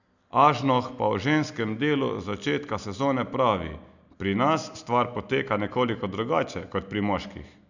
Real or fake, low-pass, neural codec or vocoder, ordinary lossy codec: real; 7.2 kHz; none; none